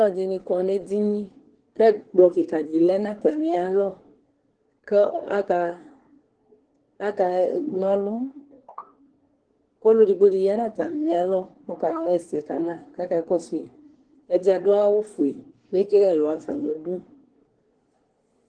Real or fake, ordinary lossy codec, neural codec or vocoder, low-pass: fake; Opus, 16 kbps; codec, 24 kHz, 1 kbps, SNAC; 9.9 kHz